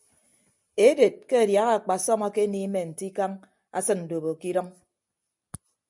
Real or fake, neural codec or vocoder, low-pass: real; none; 10.8 kHz